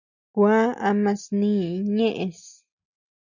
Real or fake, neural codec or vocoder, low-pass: real; none; 7.2 kHz